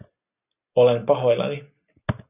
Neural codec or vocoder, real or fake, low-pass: none; real; 3.6 kHz